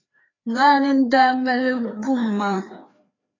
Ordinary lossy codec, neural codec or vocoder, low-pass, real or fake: AAC, 32 kbps; codec, 16 kHz, 2 kbps, FreqCodec, larger model; 7.2 kHz; fake